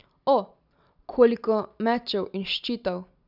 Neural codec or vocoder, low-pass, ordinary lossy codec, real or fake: none; 5.4 kHz; none; real